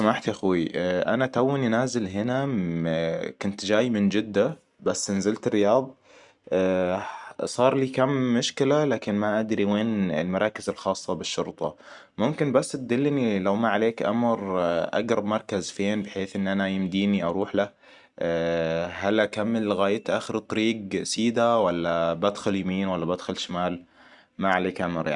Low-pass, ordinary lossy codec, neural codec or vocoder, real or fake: 10.8 kHz; none; none; real